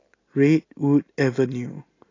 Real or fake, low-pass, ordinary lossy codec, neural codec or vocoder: real; 7.2 kHz; AAC, 32 kbps; none